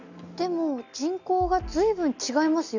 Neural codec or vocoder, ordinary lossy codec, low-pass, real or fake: none; none; 7.2 kHz; real